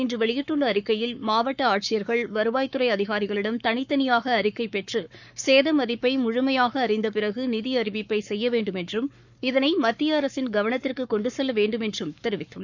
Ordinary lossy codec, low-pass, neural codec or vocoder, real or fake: none; 7.2 kHz; codec, 44.1 kHz, 7.8 kbps, Pupu-Codec; fake